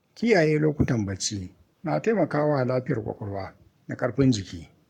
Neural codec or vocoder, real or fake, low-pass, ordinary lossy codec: codec, 44.1 kHz, 7.8 kbps, Pupu-Codec; fake; 19.8 kHz; MP3, 96 kbps